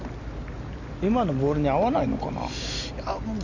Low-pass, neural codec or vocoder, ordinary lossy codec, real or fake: 7.2 kHz; none; none; real